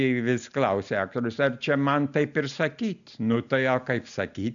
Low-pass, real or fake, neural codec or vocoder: 7.2 kHz; real; none